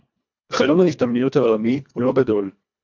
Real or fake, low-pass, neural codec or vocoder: fake; 7.2 kHz; codec, 24 kHz, 1.5 kbps, HILCodec